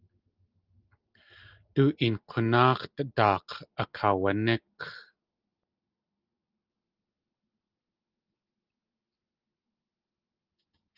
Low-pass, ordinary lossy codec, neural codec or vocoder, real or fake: 5.4 kHz; Opus, 32 kbps; none; real